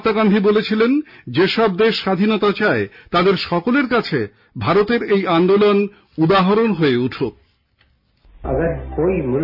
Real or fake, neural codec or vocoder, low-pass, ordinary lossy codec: real; none; 5.4 kHz; none